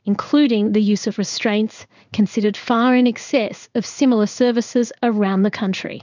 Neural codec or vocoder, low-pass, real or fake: codec, 16 kHz in and 24 kHz out, 1 kbps, XY-Tokenizer; 7.2 kHz; fake